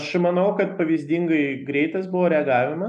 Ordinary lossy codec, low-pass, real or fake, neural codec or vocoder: MP3, 96 kbps; 9.9 kHz; real; none